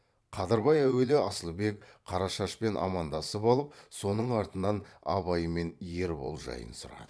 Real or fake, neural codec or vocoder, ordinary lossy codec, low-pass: fake; vocoder, 22.05 kHz, 80 mel bands, Vocos; none; none